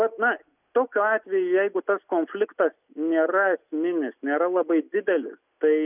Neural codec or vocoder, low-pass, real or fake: none; 3.6 kHz; real